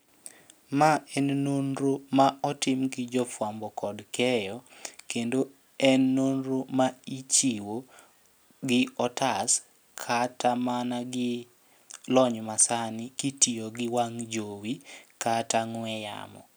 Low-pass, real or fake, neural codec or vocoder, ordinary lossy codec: none; real; none; none